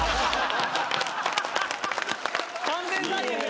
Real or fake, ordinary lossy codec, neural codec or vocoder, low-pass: real; none; none; none